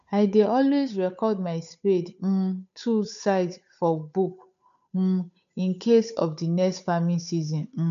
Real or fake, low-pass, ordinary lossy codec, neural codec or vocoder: fake; 7.2 kHz; none; codec, 16 kHz, 8 kbps, FunCodec, trained on Chinese and English, 25 frames a second